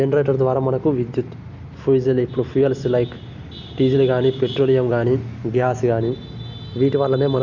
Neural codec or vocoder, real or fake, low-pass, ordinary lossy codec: none; real; 7.2 kHz; none